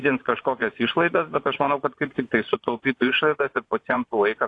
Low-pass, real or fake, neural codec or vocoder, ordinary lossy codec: 10.8 kHz; fake; vocoder, 24 kHz, 100 mel bands, Vocos; AAC, 48 kbps